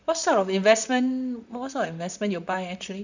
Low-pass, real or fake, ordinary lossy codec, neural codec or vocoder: 7.2 kHz; fake; none; vocoder, 44.1 kHz, 128 mel bands, Pupu-Vocoder